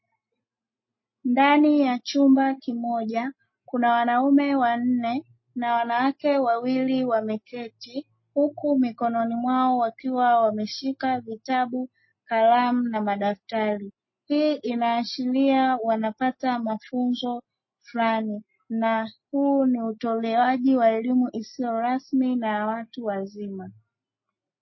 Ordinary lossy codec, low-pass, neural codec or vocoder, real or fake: MP3, 24 kbps; 7.2 kHz; none; real